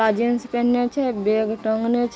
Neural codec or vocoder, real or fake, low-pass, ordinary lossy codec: none; real; none; none